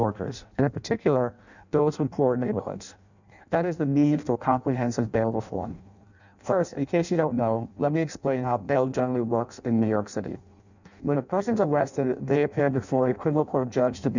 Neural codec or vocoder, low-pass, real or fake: codec, 16 kHz in and 24 kHz out, 0.6 kbps, FireRedTTS-2 codec; 7.2 kHz; fake